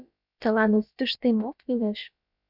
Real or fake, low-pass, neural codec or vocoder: fake; 5.4 kHz; codec, 16 kHz, about 1 kbps, DyCAST, with the encoder's durations